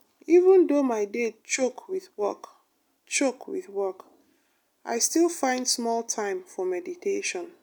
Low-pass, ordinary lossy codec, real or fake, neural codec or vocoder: none; none; real; none